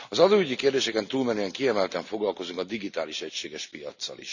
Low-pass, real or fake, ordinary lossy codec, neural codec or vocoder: 7.2 kHz; real; none; none